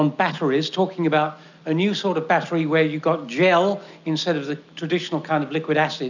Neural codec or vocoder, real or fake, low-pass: none; real; 7.2 kHz